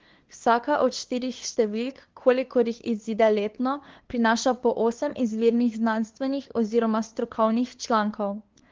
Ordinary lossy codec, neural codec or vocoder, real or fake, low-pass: Opus, 16 kbps; codec, 16 kHz, 2 kbps, FunCodec, trained on LibriTTS, 25 frames a second; fake; 7.2 kHz